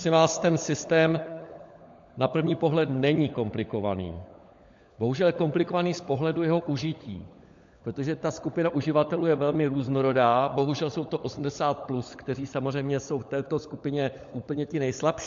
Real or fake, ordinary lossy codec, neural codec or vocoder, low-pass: fake; MP3, 48 kbps; codec, 16 kHz, 16 kbps, FunCodec, trained on LibriTTS, 50 frames a second; 7.2 kHz